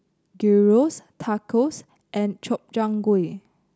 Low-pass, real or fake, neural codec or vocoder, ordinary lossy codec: none; real; none; none